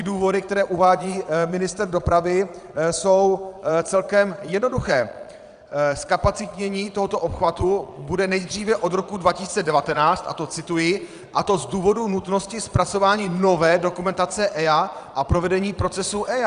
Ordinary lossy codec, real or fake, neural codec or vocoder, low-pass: Opus, 64 kbps; fake; vocoder, 22.05 kHz, 80 mel bands, Vocos; 9.9 kHz